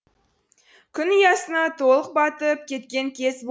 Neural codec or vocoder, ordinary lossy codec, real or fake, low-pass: none; none; real; none